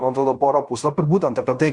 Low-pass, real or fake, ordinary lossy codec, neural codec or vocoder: 10.8 kHz; fake; Opus, 64 kbps; codec, 16 kHz in and 24 kHz out, 0.9 kbps, LongCat-Audio-Codec, fine tuned four codebook decoder